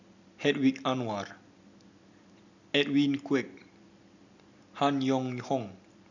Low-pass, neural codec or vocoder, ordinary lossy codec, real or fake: 7.2 kHz; none; none; real